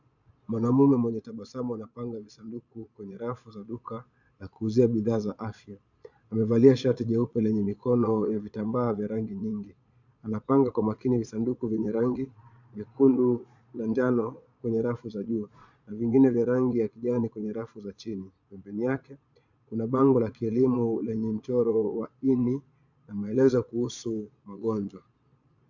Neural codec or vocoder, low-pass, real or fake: vocoder, 22.05 kHz, 80 mel bands, Vocos; 7.2 kHz; fake